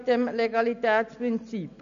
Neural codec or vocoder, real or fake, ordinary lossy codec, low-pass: none; real; none; 7.2 kHz